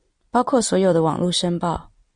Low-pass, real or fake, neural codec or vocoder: 9.9 kHz; real; none